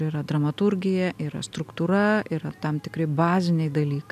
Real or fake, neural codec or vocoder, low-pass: real; none; 14.4 kHz